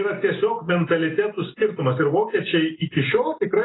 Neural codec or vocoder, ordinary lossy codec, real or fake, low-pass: none; AAC, 16 kbps; real; 7.2 kHz